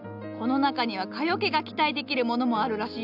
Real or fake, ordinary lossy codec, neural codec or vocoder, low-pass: real; none; none; 5.4 kHz